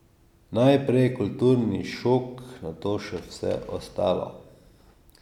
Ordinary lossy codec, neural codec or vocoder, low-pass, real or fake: none; none; 19.8 kHz; real